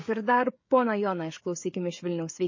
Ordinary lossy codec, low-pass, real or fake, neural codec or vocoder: MP3, 32 kbps; 7.2 kHz; fake; codec, 16 kHz, 16 kbps, FreqCodec, smaller model